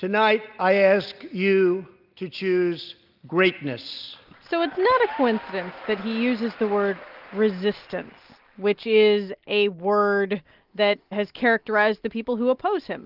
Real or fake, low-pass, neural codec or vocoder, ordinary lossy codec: real; 5.4 kHz; none; Opus, 32 kbps